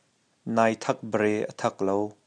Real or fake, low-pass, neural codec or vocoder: real; 9.9 kHz; none